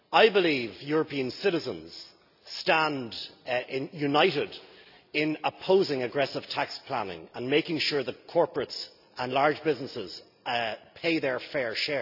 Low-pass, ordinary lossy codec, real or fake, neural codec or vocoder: 5.4 kHz; none; real; none